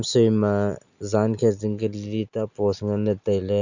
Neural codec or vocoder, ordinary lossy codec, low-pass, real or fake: none; none; 7.2 kHz; real